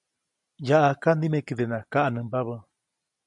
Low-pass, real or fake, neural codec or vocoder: 10.8 kHz; real; none